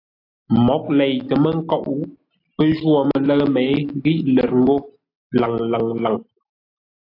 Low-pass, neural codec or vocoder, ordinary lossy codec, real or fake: 5.4 kHz; none; AAC, 32 kbps; real